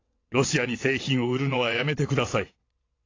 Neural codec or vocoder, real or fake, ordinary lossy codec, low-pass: vocoder, 22.05 kHz, 80 mel bands, WaveNeXt; fake; AAC, 32 kbps; 7.2 kHz